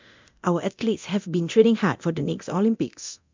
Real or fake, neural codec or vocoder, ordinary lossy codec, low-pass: fake; codec, 24 kHz, 0.9 kbps, DualCodec; MP3, 64 kbps; 7.2 kHz